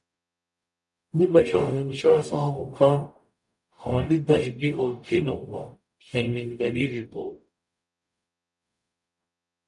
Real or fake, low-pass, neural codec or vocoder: fake; 10.8 kHz; codec, 44.1 kHz, 0.9 kbps, DAC